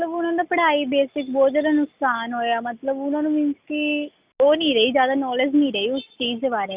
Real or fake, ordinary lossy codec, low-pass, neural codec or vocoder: real; Opus, 64 kbps; 3.6 kHz; none